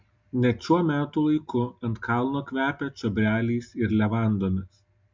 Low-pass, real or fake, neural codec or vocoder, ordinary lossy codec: 7.2 kHz; real; none; MP3, 64 kbps